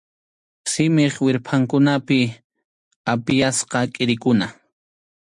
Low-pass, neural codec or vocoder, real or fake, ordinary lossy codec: 10.8 kHz; none; real; MP3, 64 kbps